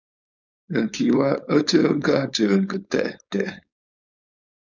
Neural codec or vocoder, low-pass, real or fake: codec, 16 kHz, 4.8 kbps, FACodec; 7.2 kHz; fake